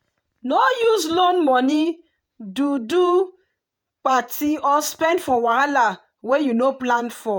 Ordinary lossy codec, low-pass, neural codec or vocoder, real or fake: none; none; vocoder, 48 kHz, 128 mel bands, Vocos; fake